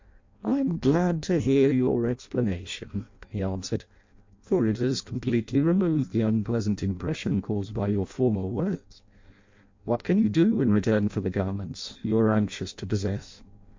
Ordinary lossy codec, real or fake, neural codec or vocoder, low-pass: MP3, 48 kbps; fake; codec, 16 kHz in and 24 kHz out, 0.6 kbps, FireRedTTS-2 codec; 7.2 kHz